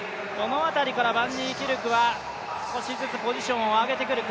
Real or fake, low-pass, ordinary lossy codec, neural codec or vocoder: real; none; none; none